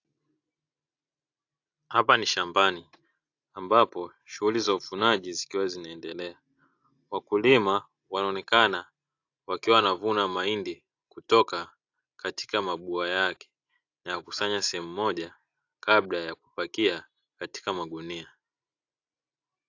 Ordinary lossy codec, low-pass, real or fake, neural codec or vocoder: AAC, 48 kbps; 7.2 kHz; real; none